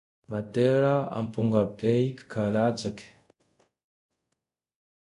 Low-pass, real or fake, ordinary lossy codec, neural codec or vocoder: 10.8 kHz; fake; none; codec, 24 kHz, 0.5 kbps, DualCodec